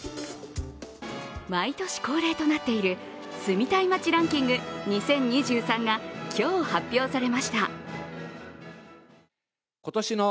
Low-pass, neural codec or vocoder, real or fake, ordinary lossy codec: none; none; real; none